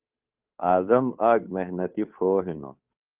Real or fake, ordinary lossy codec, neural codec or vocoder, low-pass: fake; Opus, 32 kbps; codec, 16 kHz, 2 kbps, FunCodec, trained on Chinese and English, 25 frames a second; 3.6 kHz